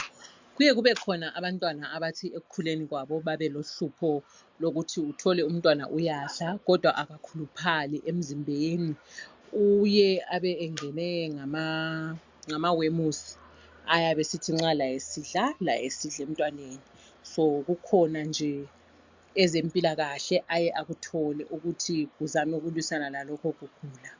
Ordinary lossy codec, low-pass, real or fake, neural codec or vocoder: MP3, 64 kbps; 7.2 kHz; real; none